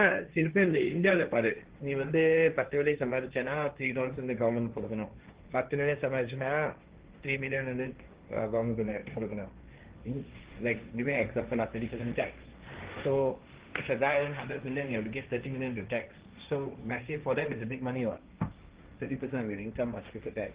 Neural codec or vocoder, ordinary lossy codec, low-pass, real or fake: codec, 16 kHz, 1.1 kbps, Voila-Tokenizer; Opus, 16 kbps; 3.6 kHz; fake